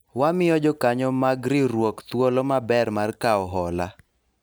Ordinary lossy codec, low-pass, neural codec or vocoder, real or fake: none; none; none; real